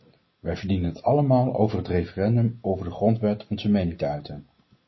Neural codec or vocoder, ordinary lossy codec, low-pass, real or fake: none; MP3, 24 kbps; 7.2 kHz; real